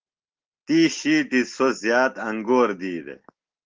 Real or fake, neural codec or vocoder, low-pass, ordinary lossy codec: real; none; 7.2 kHz; Opus, 16 kbps